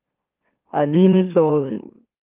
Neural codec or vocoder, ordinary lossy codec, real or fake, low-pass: autoencoder, 44.1 kHz, a latent of 192 numbers a frame, MeloTTS; Opus, 32 kbps; fake; 3.6 kHz